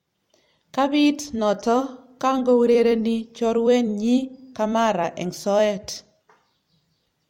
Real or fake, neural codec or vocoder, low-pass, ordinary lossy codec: fake; vocoder, 44.1 kHz, 128 mel bands every 256 samples, BigVGAN v2; 19.8 kHz; MP3, 64 kbps